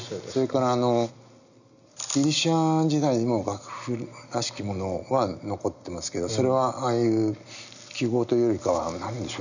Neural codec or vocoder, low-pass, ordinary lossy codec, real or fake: none; 7.2 kHz; none; real